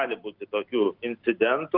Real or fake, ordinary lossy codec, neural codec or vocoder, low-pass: real; Opus, 24 kbps; none; 5.4 kHz